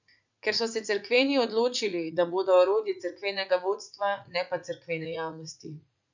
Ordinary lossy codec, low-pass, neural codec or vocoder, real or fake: none; 7.2 kHz; vocoder, 44.1 kHz, 80 mel bands, Vocos; fake